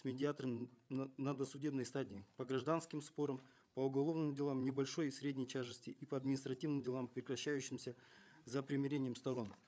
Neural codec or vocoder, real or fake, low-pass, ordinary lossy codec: codec, 16 kHz, 8 kbps, FreqCodec, larger model; fake; none; none